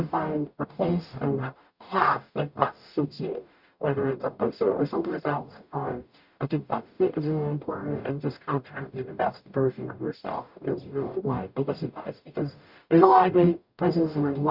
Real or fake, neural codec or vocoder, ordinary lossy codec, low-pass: fake; codec, 44.1 kHz, 0.9 kbps, DAC; Opus, 64 kbps; 5.4 kHz